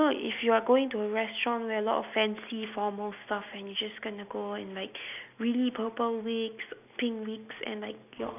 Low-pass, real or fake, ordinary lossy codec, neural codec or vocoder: 3.6 kHz; real; none; none